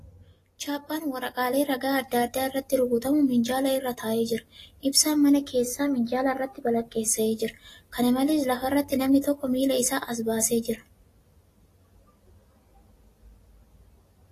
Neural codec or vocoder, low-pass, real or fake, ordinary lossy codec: none; 14.4 kHz; real; AAC, 48 kbps